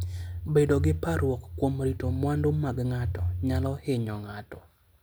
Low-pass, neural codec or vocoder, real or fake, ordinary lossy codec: none; none; real; none